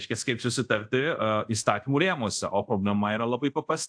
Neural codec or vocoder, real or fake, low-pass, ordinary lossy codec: codec, 24 kHz, 0.5 kbps, DualCodec; fake; 9.9 kHz; AAC, 64 kbps